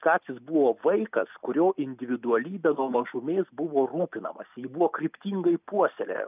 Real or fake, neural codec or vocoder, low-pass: real; none; 3.6 kHz